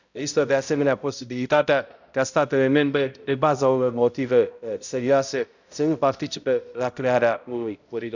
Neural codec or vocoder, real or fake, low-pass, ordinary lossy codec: codec, 16 kHz, 0.5 kbps, X-Codec, HuBERT features, trained on balanced general audio; fake; 7.2 kHz; none